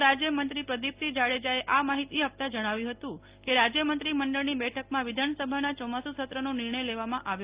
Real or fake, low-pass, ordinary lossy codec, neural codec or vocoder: real; 3.6 kHz; Opus, 24 kbps; none